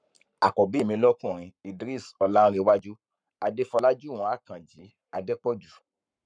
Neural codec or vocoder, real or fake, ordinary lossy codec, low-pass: codec, 44.1 kHz, 7.8 kbps, Pupu-Codec; fake; none; 9.9 kHz